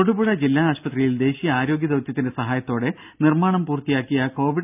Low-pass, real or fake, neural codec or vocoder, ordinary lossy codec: 3.6 kHz; real; none; none